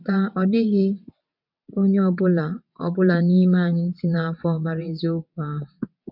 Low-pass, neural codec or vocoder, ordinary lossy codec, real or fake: 5.4 kHz; vocoder, 22.05 kHz, 80 mel bands, WaveNeXt; none; fake